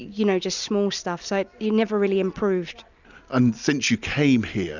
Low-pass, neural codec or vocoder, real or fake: 7.2 kHz; none; real